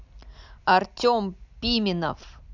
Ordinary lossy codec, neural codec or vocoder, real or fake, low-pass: none; none; real; 7.2 kHz